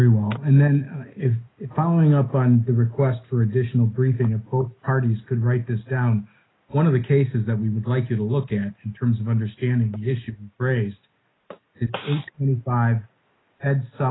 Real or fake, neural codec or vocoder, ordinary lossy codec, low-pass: real; none; AAC, 16 kbps; 7.2 kHz